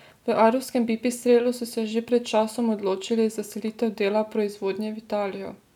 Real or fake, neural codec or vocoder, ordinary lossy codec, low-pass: real; none; none; 19.8 kHz